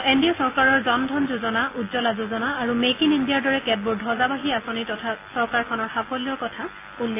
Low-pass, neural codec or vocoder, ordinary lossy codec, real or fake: 3.6 kHz; none; AAC, 24 kbps; real